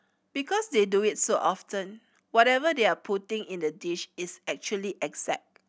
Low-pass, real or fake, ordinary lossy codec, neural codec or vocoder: none; real; none; none